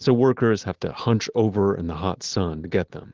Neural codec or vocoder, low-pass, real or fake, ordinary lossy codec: none; 7.2 kHz; real; Opus, 16 kbps